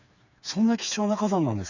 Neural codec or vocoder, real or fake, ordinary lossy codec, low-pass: codec, 16 kHz, 4 kbps, FreqCodec, smaller model; fake; none; 7.2 kHz